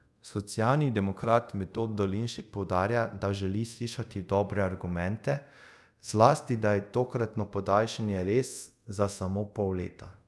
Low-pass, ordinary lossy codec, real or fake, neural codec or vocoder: none; none; fake; codec, 24 kHz, 0.5 kbps, DualCodec